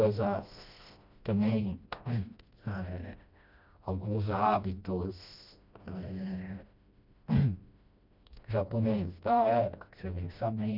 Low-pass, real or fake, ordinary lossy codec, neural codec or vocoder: 5.4 kHz; fake; none; codec, 16 kHz, 1 kbps, FreqCodec, smaller model